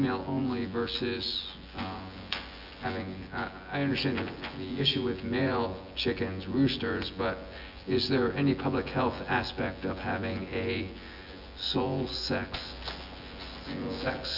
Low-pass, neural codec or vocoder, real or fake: 5.4 kHz; vocoder, 24 kHz, 100 mel bands, Vocos; fake